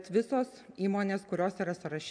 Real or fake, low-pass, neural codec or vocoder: real; 9.9 kHz; none